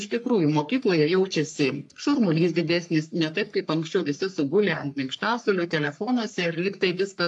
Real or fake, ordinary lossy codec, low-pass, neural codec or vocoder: fake; AAC, 64 kbps; 10.8 kHz; codec, 44.1 kHz, 3.4 kbps, Pupu-Codec